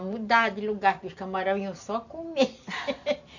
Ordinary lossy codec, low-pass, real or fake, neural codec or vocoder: none; 7.2 kHz; real; none